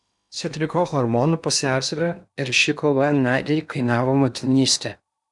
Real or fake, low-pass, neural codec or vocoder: fake; 10.8 kHz; codec, 16 kHz in and 24 kHz out, 0.8 kbps, FocalCodec, streaming, 65536 codes